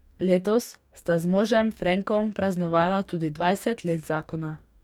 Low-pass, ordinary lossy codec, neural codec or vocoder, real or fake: 19.8 kHz; none; codec, 44.1 kHz, 2.6 kbps, DAC; fake